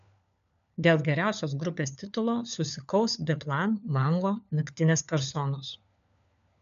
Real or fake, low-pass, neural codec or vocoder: fake; 7.2 kHz; codec, 16 kHz, 4 kbps, FunCodec, trained on LibriTTS, 50 frames a second